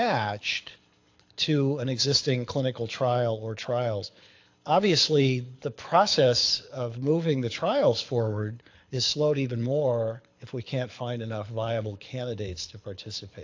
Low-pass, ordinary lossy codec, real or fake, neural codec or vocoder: 7.2 kHz; AAC, 48 kbps; fake; codec, 24 kHz, 6 kbps, HILCodec